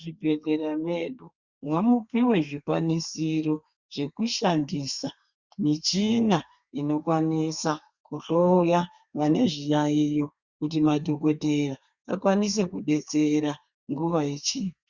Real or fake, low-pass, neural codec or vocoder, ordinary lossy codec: fake; 7.2 kHz; codec, 32 kHz, 1.9 kbps, SNAC; Opus, 64 kbps